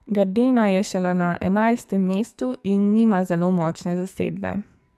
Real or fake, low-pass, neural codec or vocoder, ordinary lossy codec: fake; 14.4 kHz; codec, 44.1 kHz, 2.6 kbps, SNAC; MP3, 96 kbps